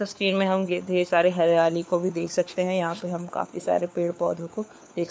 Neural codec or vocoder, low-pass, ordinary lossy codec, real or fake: codec, 16 kHz, 4 kbps, FunCodec, trained on LibriTTS, 50 frames a second; none; none; fake